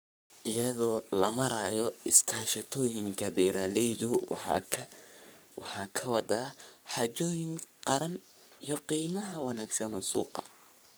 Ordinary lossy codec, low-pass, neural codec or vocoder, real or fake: none; none; codec, 44.1 kHz, 3.4 kbps, Pupu-Codec; fake